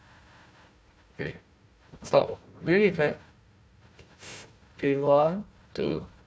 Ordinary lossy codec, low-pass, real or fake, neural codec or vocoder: none; none; fake; codec, 16 kHz, 1 kbps, FunCodec, trained on Chinese and English, 50 frames a second